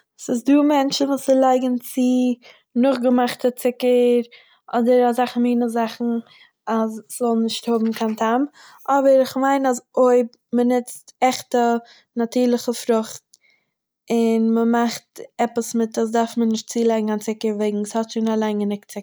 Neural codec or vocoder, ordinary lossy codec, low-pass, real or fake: none; none; none; real